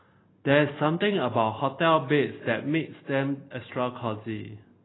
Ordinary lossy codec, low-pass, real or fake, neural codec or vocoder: AAC, 16 kbps; 7.2 kHz; real; none